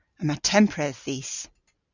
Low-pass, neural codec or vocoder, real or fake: 7.2 kHz; none; real